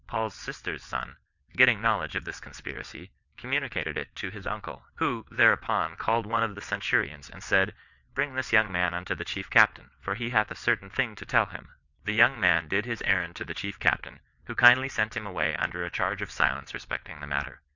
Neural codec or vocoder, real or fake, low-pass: vocoder, 22.05 kHz, 80 mel bands, WaveNeXt; fake; 7.2 kHz